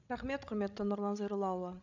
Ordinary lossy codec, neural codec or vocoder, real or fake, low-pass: none; codec, 16 kHz, 16 kbps, FreqCodec, larger model; fake; 7.2 kHz